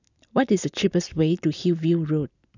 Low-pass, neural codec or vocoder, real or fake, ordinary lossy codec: 7.2 kHz; none; real; none